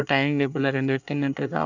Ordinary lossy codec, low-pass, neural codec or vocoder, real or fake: none; 7.2 kHz; codec, 24 kHz, 1 kbps, SNAC; fake